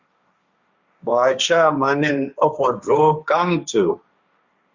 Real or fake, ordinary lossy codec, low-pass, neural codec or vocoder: fake; Opus, 64 kbps; 7.2 kHz; codec, 16 kHz, 1.1 kbps, Voila-Tokenizer